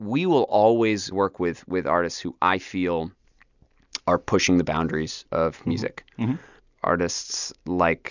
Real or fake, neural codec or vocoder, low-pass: real; none; 7.2 kHz